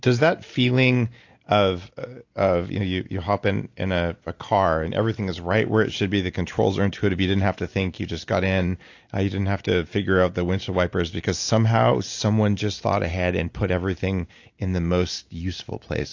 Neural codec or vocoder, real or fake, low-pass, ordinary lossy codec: none; real; 7.2 kHz; AAC, 48 kbps